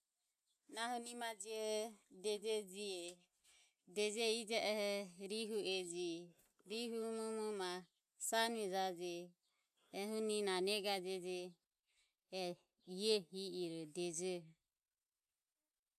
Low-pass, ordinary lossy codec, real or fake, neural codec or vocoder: 14.4 kHz; none; real; none